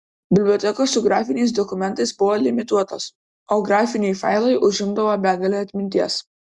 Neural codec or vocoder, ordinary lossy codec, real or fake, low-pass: none; Opus, 64 kbps; real; 10.8 kHz